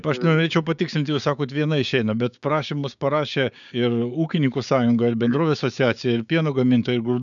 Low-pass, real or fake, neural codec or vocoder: 7.2 kHz; fake; codec, 16 kHz, 6 kbps, DAC